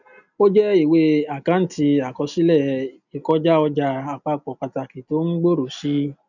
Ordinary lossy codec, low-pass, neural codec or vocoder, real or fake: none; 7.2 kHz; none; real